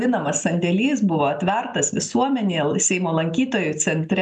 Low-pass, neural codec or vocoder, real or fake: 10.8 kHz; none; real